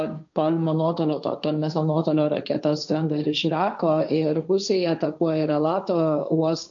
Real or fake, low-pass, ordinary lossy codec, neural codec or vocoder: fake; 7.2 kHz; MP3, 64 kbps; codec, 16 kHz, 1.1 kbps, Voila-Tokenizer